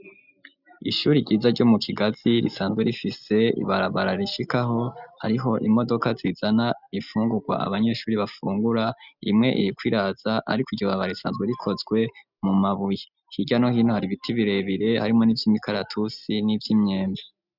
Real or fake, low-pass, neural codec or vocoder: real; 5.4 kHz; none